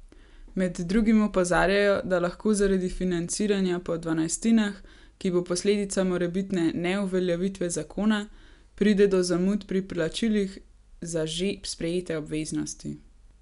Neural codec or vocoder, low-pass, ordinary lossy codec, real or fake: none; 10.8 kHz; none; real